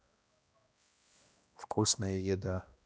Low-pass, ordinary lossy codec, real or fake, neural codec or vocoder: none; none; fake; codec, 16 kHz, 1 kbps, X-Codec, HuBERT features, trained on balanced general audio